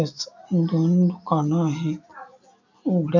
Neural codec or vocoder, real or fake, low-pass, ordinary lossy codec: none; real; 7.2 kHz; none